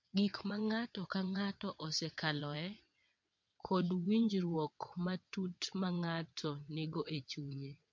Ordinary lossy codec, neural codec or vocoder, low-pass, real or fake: MP3, 48 kbps; vocoder, 22.05 kHz, 80 mel bands, WaveNeXt; 7.2 kHz; fake